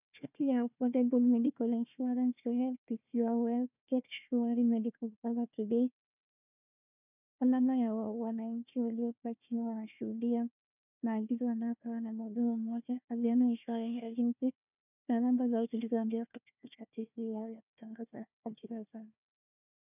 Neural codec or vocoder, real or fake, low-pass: codec, 16 kHz, 1 kbps, FunCodec, trained on Chinese and English, 50 frames a second; fake; 3.6 kHz